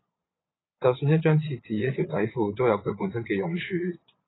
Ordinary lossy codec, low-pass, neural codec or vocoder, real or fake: AAC, 16 kbps; 7.2 kHz; vocoder, 22.05 kHz, 80 mel bands, Vocos; fake